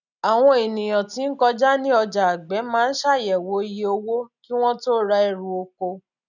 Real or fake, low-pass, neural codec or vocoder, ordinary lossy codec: real; 7.2 kHz; none; none